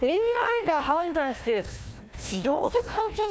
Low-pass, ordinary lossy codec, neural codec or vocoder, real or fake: none; none; codec, 16 kHz, 1 kbps, FunCodec, trained on Chinese and English, 50 frames a second; fake